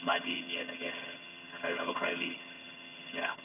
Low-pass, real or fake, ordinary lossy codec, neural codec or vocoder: 3.6 kHz; fake; none; vocoder, 22.05 kHz, 80 mel bands, HiFi-GAN